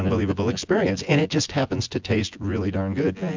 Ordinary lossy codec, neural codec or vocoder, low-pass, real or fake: MP3, 64 kbps; vocoder, 24 kHz, 100 mel bands, Vocos; 7.2 kHz; fake